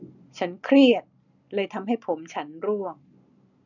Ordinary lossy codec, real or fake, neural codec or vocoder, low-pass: none; real; none; 7.2 kHz